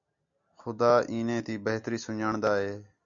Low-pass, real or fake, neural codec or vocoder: 7.2 kHz; real; none